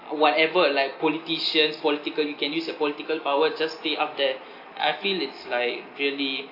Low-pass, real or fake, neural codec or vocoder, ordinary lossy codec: 5.4 kHz; real; none; AAC, 32 kbps